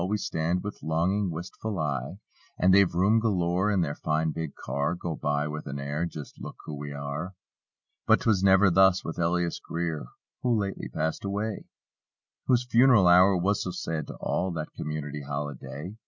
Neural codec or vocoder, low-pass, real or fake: none; 7.2 kHz; real